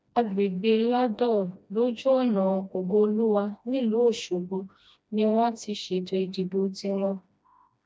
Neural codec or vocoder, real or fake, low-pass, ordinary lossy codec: codec, 16 kHz, 1 kbps, FreqCodec, smaller model; fake; none; none